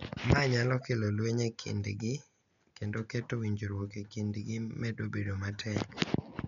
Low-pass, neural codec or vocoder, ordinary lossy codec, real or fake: 7.2 kHz; none; none; real